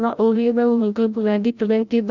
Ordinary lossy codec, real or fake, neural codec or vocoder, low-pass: none; fake; codec, 16 kHz, 0.5 kbps, FreqCodec, larger model; 7.2 kHz